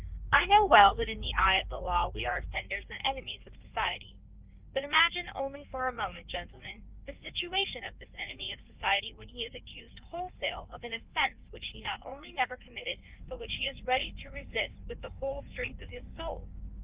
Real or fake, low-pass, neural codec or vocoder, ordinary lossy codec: fake; 3.6 kHz; codec, 16 kHz in and 24 kHz out, 1.1 kbps, FireRedTTS-2 codec; Opus, 32 kbps